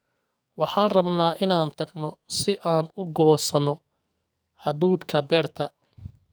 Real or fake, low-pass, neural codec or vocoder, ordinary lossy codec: fake; none; codec, 44.1 kHz, 2.6 kbps, SNAC; none